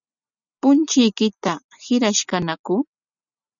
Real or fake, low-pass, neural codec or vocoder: real; 7.2 kHz; none